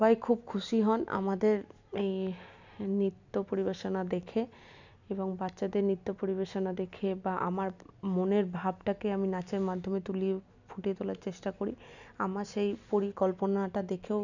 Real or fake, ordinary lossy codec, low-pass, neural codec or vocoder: real; none; 7.2 kHz; none